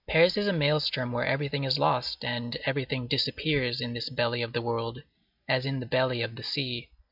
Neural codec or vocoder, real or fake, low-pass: none; real; 5.4 kHz